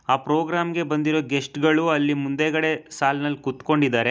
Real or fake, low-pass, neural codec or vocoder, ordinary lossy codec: real; none; none; none